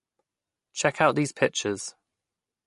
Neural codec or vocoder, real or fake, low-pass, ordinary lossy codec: none; real; 14.4 kHz; MP3, 48 kbps